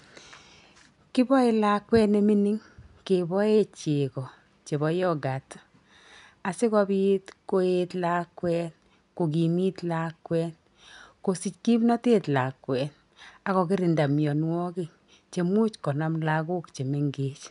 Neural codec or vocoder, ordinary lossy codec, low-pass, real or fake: none; none; 10.8 kHz; real